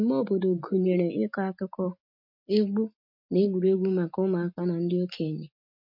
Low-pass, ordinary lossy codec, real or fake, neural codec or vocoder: 5.4 kHz; MP3, 32 kbps; real; none